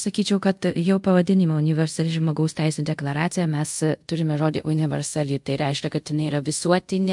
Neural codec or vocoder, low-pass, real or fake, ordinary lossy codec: codec, 24 kHz, 0.5 kbps, DualCodec; 10.8 kHz; fake; MP3, 64 kbps